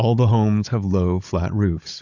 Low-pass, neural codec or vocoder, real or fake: 7.2 kHz; codec, 16 kHz, 16 kbps, FunCodec, trained on Chinese and English, 50 frames a second; fake